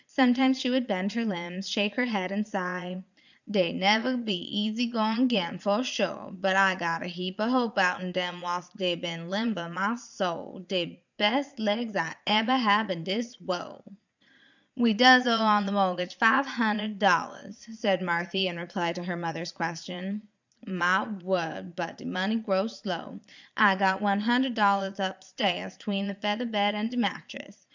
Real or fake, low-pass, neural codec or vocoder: fake; 7.2 kHz; vocoder, 22.05 kHz, 80 mel bands, Vocos